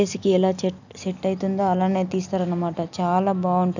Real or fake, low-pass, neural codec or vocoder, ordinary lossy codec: real; 7.2 kHz; none; none